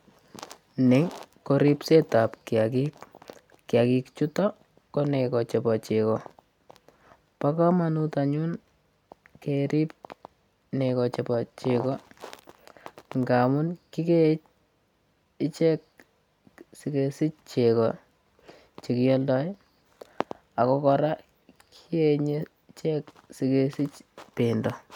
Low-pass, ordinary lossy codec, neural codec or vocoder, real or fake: 19.8 kHz; none; none; real